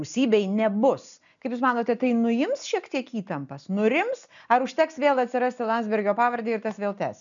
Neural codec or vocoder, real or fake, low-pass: none; real; 7.2 kHz